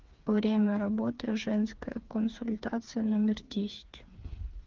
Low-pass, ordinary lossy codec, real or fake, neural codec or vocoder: 7.2 kHz; Opus, 32 kbps; fake; codec, 16 kHz, 4 kbps, FreqCodec, smaller model